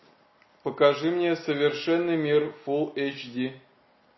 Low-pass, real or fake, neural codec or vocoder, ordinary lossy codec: 7.2 kHz; real; none; MP3, 24 kbps